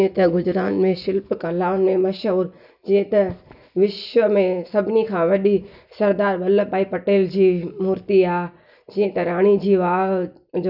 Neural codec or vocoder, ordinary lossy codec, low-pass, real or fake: none; none; 5.4 kHz; real